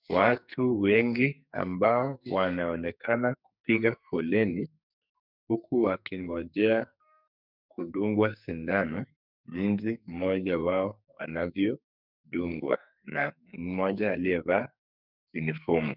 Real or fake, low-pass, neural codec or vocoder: fake; 5.4 kHz; codec, 44.1 kHz, 2.6 kbps, SNAC